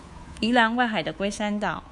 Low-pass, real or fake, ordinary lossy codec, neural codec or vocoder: 10.8 kHz; fake; Opus, 64 kbps; codec, 24 kHz, 3.1 kbps, DualCodec